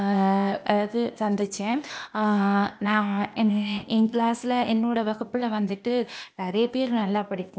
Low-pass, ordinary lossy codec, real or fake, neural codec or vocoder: none; none; fake; codec, 16 kHz, 0.8 kbps, ZipCodec